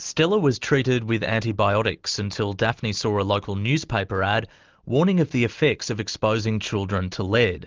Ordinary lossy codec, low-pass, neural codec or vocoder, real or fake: Opus, 24 kbps; 7.2 kHz; none; real